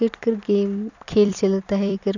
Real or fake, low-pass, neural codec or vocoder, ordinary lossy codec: fake; 7.2 kHz; vocoder, 44.1 kHz, 128 mel bands every 256 samples, BigVGAN v2; none